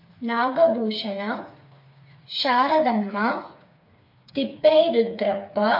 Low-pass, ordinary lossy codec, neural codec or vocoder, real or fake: 5.4 kHz; MP3, 32 kbps; codec, 16 kHz, 4 kbps, FreqCodec, smaller model; fake